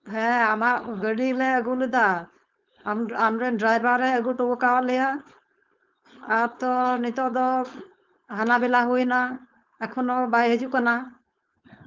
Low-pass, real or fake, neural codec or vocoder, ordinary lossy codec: 7.2 kHz; fake; codec, 16 kHz, 4.8 kbps, FACodec; Opus, 32 kbps